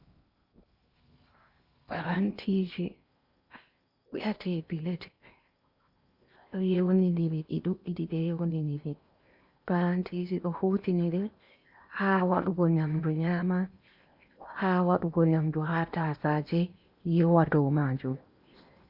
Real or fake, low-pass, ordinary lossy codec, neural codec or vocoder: fake; 5.4 kHz; Opus, 64 kbps; codec, 16 kHz in and 24 kHz out, 0.8 kbps, FocalCodec, streaming, 65536 codes